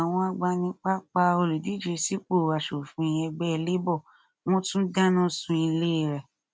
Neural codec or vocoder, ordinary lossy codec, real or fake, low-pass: none; none; real; none